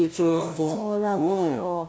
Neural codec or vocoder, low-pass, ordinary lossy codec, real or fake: codec, 16 kHz, 0.5 kbps, FunCodec, trained on LibriTTS, 25 frames a second; none; none; fake